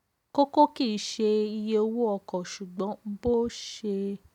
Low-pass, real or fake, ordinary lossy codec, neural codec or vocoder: 19.8 kHz; real; none; none